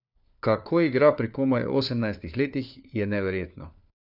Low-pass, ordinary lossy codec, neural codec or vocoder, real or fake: 5.4 kHz; none; codec, 16 kHz, 4 kbps, FunCodec, trained on LibriTTS, 50 frames a second; fake